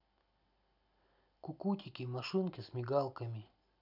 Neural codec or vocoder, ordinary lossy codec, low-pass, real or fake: none; none; 5.4 kHz; real